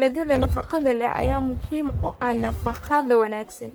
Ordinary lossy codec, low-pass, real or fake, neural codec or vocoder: none; none; fake; codec, 44.1 kHz, 1.7 kbps, Pupu-Codec